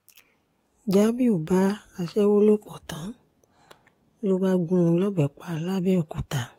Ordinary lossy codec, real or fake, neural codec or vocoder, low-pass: AAC, 48 kbps; fake; codec, 44.1 kHz, 7.8 kbps, DAC; 19.8 kHz